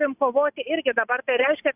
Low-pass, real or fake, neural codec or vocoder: 3.6 kHz; real; none